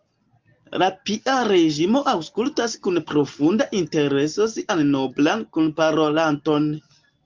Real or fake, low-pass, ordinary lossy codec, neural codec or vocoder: real; 7.2 kHz; Opus, 32 kbps; none